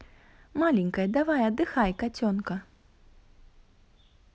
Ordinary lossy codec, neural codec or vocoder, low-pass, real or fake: none; none; none; real